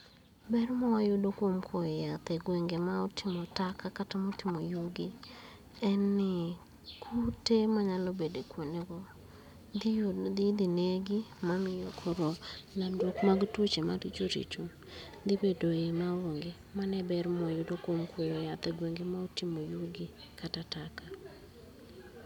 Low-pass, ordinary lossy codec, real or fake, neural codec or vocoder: 19.8 kHz; none; real; none